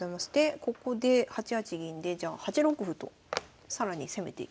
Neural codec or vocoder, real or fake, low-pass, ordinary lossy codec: none; real; none; none